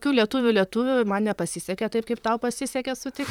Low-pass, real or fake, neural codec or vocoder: 19.8 kHz; fake; vocoder, 44.1 kHz, 128 mel bands, Pupu-Vocoder